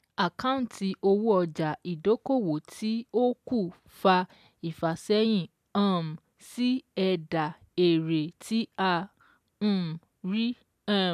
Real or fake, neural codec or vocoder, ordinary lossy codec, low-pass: real; none; AAC, 96 kbps; 14.4 kHz